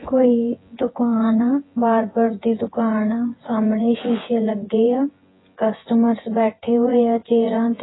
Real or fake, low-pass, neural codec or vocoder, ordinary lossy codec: fake; 7.2 kHz; vocoder, 24 kHz, 100 mel bands, Vocos; AAC, 16 kbps